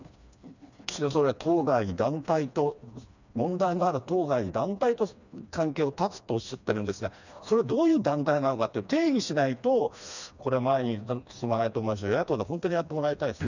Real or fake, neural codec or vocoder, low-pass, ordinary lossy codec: fake; codec, 16 kHz, 2 kbps, FreqCodec, smaller model; 7.2 kHz; none